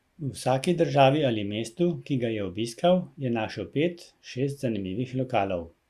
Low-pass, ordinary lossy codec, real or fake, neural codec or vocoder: 14.4 kHz; Opus, 64 kbps; real; none